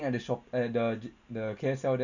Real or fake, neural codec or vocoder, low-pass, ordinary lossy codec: real; none; 7.2 kHz; none